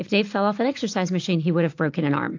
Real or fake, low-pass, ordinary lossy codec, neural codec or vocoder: real; 7.2 kHz; AAC, 48 kbps; none